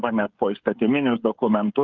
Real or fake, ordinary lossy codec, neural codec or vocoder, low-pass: fake; Opus, 24 kbps; codec, 16 kHz, 16 kbps, FreqCodec, smaller model; 7.2 kHz